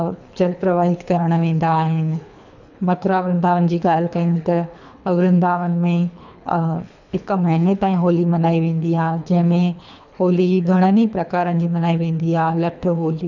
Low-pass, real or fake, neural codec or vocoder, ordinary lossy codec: 7.2 kHz; fake; codec, 24 kHz, 3 kbps, HILCodec; none